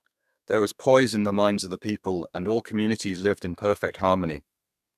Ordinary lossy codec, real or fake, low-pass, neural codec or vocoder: none; fake; 14.4 kHz; codec, 32 kHz, 1.9 kbps, SNAC